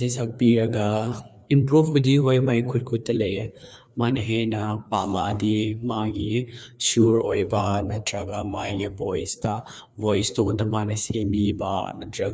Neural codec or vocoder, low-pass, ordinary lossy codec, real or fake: codec, 16 kHz, 2 kbps, FreqCodec, larger model; none; none; fake